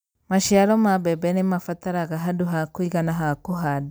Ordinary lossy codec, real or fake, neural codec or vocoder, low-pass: none; real; none; none